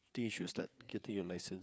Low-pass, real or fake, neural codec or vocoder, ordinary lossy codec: none; fake; codec, 16 kHz, 4 kbps, FreqCodec, larger model; none